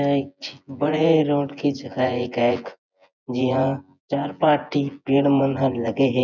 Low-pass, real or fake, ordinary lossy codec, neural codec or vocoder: 7.2 kHz; fake; none; vocoder, 24 kHz, 100 mel bands, Vocos